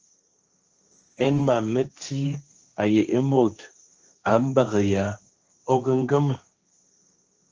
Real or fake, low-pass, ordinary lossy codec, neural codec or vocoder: fake; 7.2 kHz; Opus, 24 kbps; codec, 16 kHz, 1.1 kbps, Voila-Tokenizer